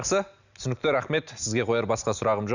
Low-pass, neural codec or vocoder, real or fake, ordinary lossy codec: 7.2 kHz; none; real; none